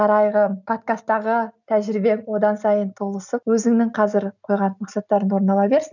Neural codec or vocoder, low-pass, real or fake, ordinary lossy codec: none; 7.2 kHz; real; none